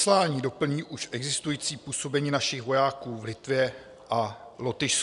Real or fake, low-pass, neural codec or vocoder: real; 10.8 kHz; none